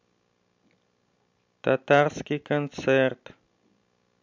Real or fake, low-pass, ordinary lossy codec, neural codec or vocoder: real; 7.2 kHz; MP3, 64 kbps; none